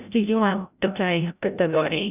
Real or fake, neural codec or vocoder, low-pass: fake; codec, 16 kHz, 0.5 kbps, FreqCodec, larger model; 3.6 kHz